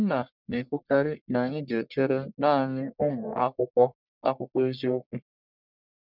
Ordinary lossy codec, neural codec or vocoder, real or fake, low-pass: none; codec, 44.1 kHz, 1.7 kbps, Pupu-Codec; fake; 5.4 kHz